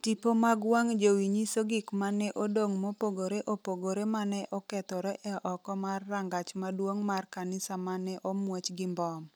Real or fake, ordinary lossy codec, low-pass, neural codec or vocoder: real; none; none; none